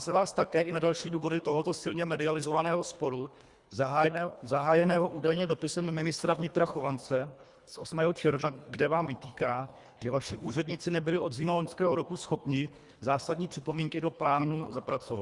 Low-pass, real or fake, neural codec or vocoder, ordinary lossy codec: 10.8 kHz; fake; codec, 24 kHz, 1.5 kbps, HILCodec; Opus, 64 kbps